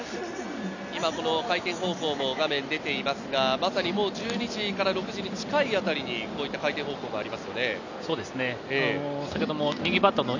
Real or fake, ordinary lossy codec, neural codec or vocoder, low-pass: real; none; none; 7.2 kHz